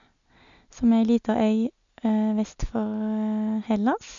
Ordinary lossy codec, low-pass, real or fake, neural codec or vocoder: MP3, 96 kbps; 7.2 kHz; real; none